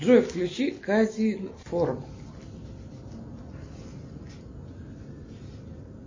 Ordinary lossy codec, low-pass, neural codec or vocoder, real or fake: MP3, 32 kbps; 7.2 kHz; none; real